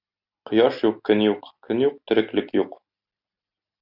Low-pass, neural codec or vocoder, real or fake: 5.4 kHz; none; real